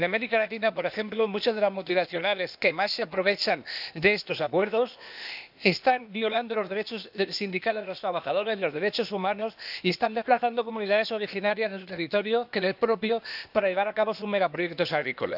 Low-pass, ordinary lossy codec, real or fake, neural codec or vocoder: 5.4 kHz; none; fake; codec, 16 kHz, 0.8 kbps, ZipCodec